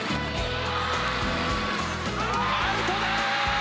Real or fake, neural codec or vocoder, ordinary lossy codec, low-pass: real; none; none; none